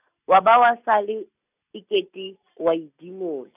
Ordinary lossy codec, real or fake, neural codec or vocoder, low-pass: none; real; none; 3.6 kHz